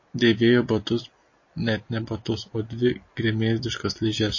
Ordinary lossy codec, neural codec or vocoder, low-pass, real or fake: MP3, 32 kbps; none; 7.2 kHz; real